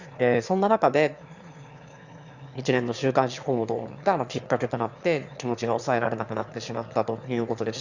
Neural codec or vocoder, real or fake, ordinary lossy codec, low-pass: autoencoder, 22.05 kHz, a latent of 192 numbers a frame, VITS, trained on one speaker; fake; Opus, 64 kbps; 7.2 kHz